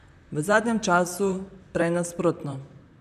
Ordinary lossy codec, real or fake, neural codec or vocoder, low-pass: none; fake; vocoder, 44.1 kHz, 128 mel bands, Pupu-Vocoder; 14.4 kHz